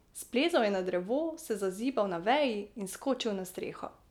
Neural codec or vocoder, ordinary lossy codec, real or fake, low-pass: none; none; real; 19.8 kHz